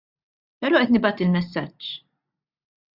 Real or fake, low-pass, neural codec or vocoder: real; 5.4 kHz; none